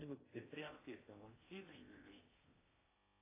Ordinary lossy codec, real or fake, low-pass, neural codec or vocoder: AAC, 16 kbps; fake; 3.6 kHz; codec, 16 kHz in and 24 kHz out, 0.8 kbps, FocalCodec, streaming, 65536 codes